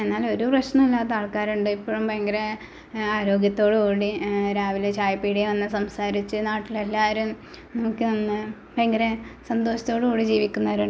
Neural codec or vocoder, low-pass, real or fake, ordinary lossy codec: none; none; real; none